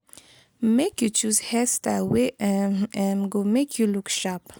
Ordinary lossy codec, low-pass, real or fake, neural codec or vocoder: none; none; real; none